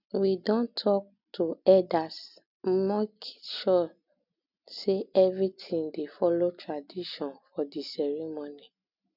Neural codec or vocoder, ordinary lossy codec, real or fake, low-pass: none; none; real; 5.4 kHz